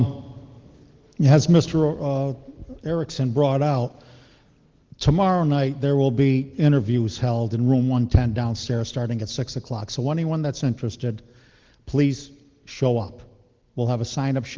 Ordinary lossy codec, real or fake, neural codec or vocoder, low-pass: Opus, 16 kbps; real; none; 7.2 kHz